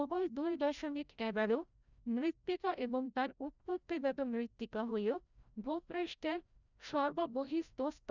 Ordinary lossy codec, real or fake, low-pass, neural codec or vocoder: none; fake; 7.2 kHz; codec, 16 kHz, 0.5 kbps, FreqCodec, larger model